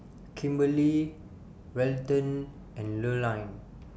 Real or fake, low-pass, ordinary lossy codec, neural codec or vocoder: real; none; none; none